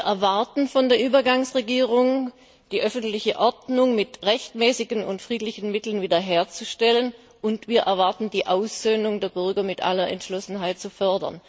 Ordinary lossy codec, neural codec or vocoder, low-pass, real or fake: none; none; none; real